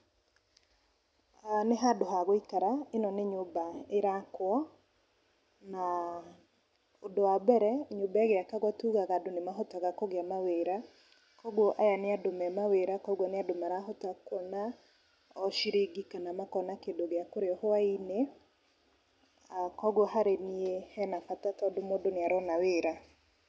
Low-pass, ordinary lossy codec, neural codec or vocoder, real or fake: none; none; none; real